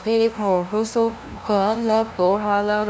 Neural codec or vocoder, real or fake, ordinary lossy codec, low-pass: codec, 16 kHz, 0.5 kbps, FunCodec, trained on LibriTTS, 25 frames a second; fake; none; none